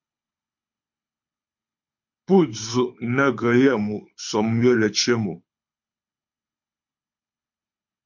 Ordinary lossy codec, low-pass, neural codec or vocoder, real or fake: MP3, 48 kbps; 7.2 kHz; codec, 24 kHz, 6 kbps, HILCodec; fake